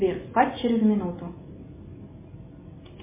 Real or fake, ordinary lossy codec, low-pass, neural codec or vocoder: real; MP3, 16 kbps; 3.6 kHz; none